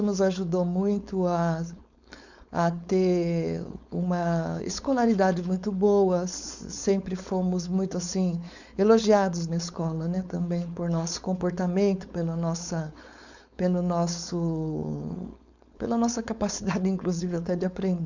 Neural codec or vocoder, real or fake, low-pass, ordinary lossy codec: codec, 16 kHz, 4.8 kbps, FACodec; fake; 7.2 kHz; none